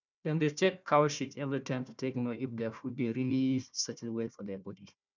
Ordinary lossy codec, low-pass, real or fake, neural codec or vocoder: none; 7.2 kHz; fake; codec, 16 kHz, 1 kbps, FunCodec, trained on Chinese and English, 50 frames a second